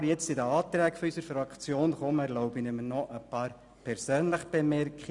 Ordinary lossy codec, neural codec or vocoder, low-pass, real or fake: none; none; 10.8 kHz; real